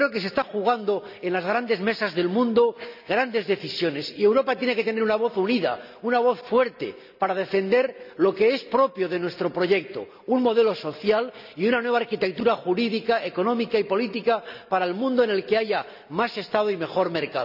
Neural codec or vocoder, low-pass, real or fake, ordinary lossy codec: none; 5.4 kHz; real; none